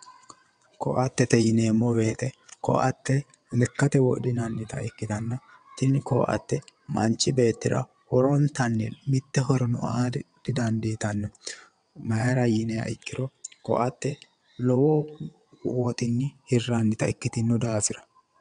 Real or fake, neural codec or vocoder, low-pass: fake; vocoder, 22.05 kHz, 80 mel bands, WaveNeXt; 9.9 kHz